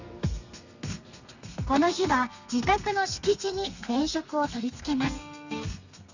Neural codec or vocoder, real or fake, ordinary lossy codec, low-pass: codec, 32 kHz, 1.9 kbps, SNAC; fake; none; 7.2 kHz